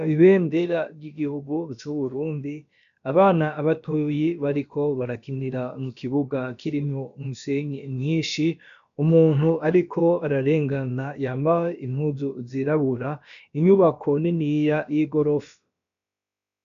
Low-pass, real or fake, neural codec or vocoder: 7.2 kHz; fake; codec, 16 kHz, about 1 kbps, DyCAST, with the encoder's durations